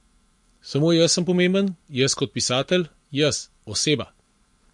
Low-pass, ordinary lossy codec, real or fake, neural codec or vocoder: 10.8 kHz; MP3, 48 kbps; fake; vocoder, 44.1 kHz, 128 mel bands every 512 samples, BigVGAN v2